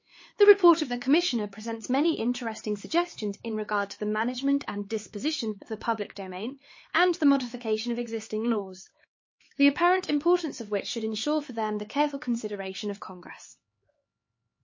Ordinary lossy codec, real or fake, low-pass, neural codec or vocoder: MP3, 32 kbps; fake; 7.2 kHz; codec, 16 kHz, 4 kbps, X-Codec, HuBERT features, trained on LibriSpeech